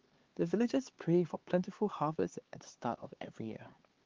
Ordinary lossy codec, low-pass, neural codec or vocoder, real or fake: Opus, 16 kbps; 7.2 kHz; codec, 16 kHz, 4 kbps, X-Codec, HuBERT features, trained on LibriSpeech; fake